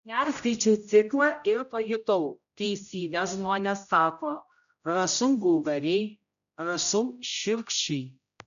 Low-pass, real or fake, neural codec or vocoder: 7.2 kHz; fake; codec, 16 kHz, 0.5 kbps, X-Codec, HuBERT features, trained on general audio